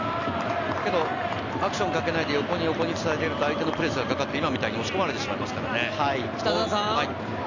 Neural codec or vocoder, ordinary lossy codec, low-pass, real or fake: none; none; 7.2 kHz; real